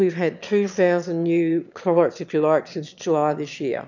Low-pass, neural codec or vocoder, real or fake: 7.2 kHz; autoencoder, 22.05 kHz, a latent of 192 numbers a frame, VITS, trained on one speaker; fake